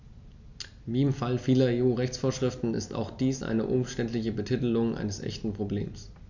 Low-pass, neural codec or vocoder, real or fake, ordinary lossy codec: 7.2 kHz; none; real; none